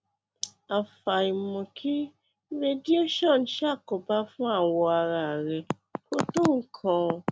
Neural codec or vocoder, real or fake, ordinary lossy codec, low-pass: none; real; none; none